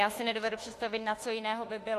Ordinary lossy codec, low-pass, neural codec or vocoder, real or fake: AAC, 48 kbps; 14.4 kHz; autoencoder, 48 kHz, 32 numbers a frame, DAC-VAE, trained on Japanese speech; fake